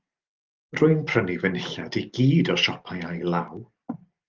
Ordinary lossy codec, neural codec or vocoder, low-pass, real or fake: Opus, 24 kbps; none; 7.2 kHz; real